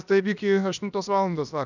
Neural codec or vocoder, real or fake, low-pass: codec, 16 kHz, about 1 kbps, DyCAST, with the encoder's durations; fake; 7.2 kHz